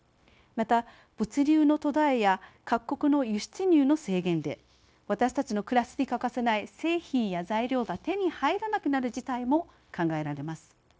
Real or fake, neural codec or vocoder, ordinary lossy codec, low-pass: fake; codec, 16 kHz, 0.9 kbps, LongCat-Audio-Codec; none; none